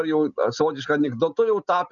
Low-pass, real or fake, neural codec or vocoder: 7.2 kHz; real; none